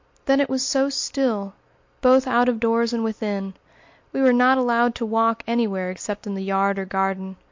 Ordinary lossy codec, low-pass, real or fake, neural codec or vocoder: MP3, 48 kbps; 7.2 kHz; real; none